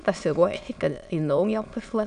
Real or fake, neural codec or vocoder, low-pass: fake; autoencoder, 22.05 kHz, a latent of 192 numbers a frame, VITS, trained on many speakers; 9.9 kHz